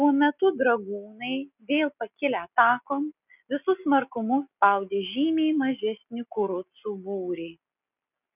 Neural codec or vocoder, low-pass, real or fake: none; 3.6 kHz; real